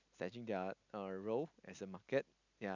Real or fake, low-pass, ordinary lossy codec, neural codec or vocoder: real; 7.2 kHz; none; none